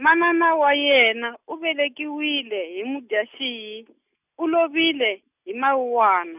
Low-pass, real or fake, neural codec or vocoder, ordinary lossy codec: 3.6 kHz; real; none; none